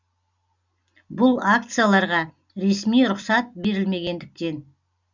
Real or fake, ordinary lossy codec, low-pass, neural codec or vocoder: real; none; 7.2 kHz; none